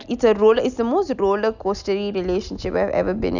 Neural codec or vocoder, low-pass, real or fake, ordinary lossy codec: none; 7.2 kHz; real; none